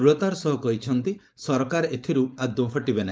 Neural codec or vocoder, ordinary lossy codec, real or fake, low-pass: codec, 16 kHz, 4.8 kbps, FACodec; none; fake; none